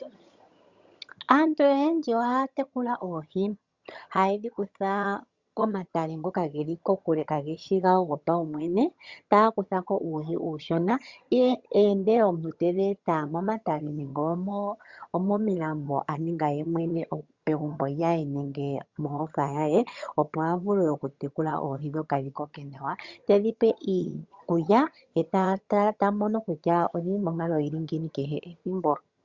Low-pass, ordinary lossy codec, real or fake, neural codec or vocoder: 7.2 kHz; Opus, 64 kbps; fake; vocoder, 22.05 kHz, 80 mel bands, HiFi-GAN